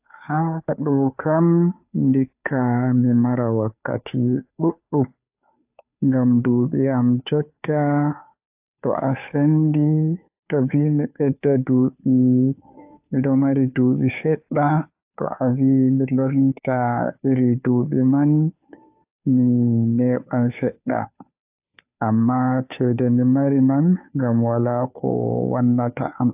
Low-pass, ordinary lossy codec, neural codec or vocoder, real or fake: 3.6 kHz; AAC, 32 kbps; codec, 16 kHz, 2 kbps, FunCodec, trained on Chinese and English, 25 frames a second; fake